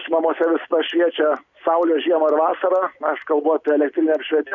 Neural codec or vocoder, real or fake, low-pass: none; real; 7.2 kHz